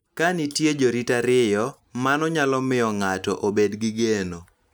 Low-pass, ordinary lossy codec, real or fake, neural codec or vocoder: none; none; real; none